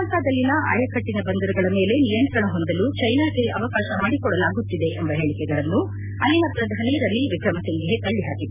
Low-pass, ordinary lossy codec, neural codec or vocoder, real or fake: 3.6 kHz; none; none; real